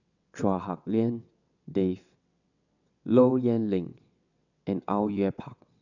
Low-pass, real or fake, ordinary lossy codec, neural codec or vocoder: 7.2 kHz; fake; none; vocoder, 22.05 kHz, 80 mel bands, WaveNeXt